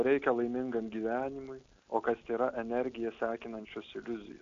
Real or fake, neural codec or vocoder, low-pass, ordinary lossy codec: real; none; 7.2 kHz; AAC, 64 kbps